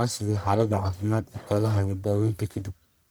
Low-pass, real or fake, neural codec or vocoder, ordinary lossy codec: none; fake; codec, 44.1 kHz, 1.7 kbps, Pupu-Codec; none